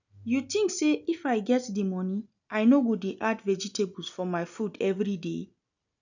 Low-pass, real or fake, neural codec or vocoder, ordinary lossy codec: 7.2 kHz; real; none; none